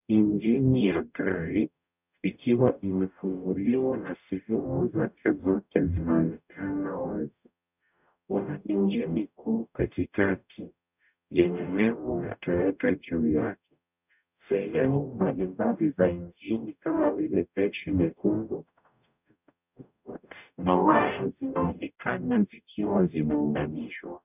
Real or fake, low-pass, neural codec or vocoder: fake; 3.6 kHz; codec, 44.1 kHz, 0.9 kbps, DAC